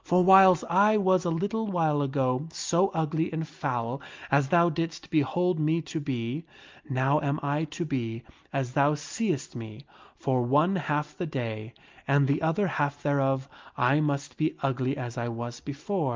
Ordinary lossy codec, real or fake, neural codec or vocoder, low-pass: Opus, 24 kbps; real; none; 7.2 kHz